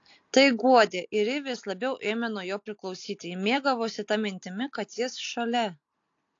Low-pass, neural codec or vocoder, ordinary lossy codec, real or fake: 7.2 kHz; none; AAC, 48 kbps; real